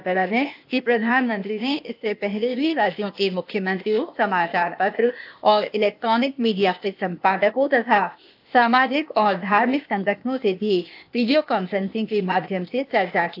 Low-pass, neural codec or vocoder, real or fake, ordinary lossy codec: 5.4 kHz; codec, 16 kHz, 0.8 kbps, ZipCodec; fake; AAC, 48 kbps